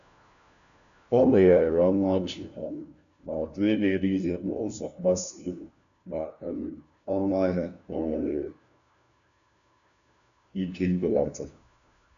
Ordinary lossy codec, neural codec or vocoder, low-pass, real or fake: none; codec, 16 kHz, 1 kbps, FunCodec, trained on LibriTTS, 50 frames a second; 7.2 kHz; fake